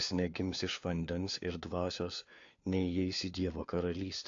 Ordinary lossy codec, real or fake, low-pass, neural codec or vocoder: AAC, 48 kbps; fake; 7.2 kHz; codec, 16 kHz, 4 kbps, FunCodec, trained on LibriTTS, 50 frames a second